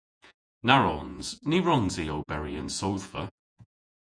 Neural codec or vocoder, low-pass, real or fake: vocoder, 48 kHz, 128 mel bands, Vocos; 9.9 kHz; fake